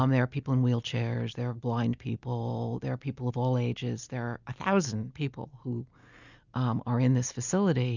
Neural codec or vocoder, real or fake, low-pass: none; real; 7.2 kHz